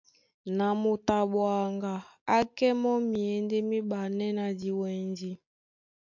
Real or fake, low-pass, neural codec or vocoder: real; 7.2 kHz; none